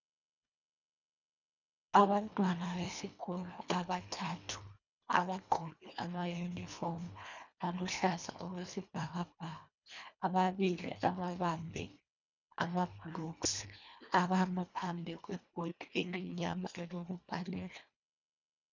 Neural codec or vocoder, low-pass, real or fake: codec, 24 kHz, 1.5 kbps, HILCodec; 7.2 kHz; fake